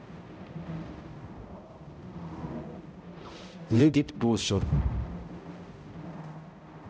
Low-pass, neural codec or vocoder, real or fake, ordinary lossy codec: none; codec, 16 kHz, 0.5 kbps, X-Codec, HuBERT features, trained on balanced general audio; fake; none